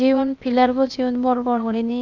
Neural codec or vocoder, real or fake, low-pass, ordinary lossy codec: codec, 16 kHz, 0.8 kbps, ZipCodec; fake; 7.2 kHz; none